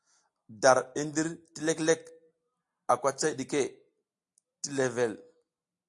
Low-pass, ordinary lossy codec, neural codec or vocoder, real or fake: 10.8 kHz; AAC, 64 kbps; none; real